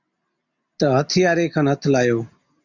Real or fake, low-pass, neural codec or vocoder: real; 7.2 kHz; none